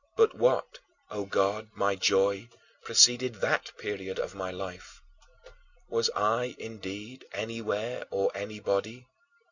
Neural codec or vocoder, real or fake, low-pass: none; real; 7.2 kHz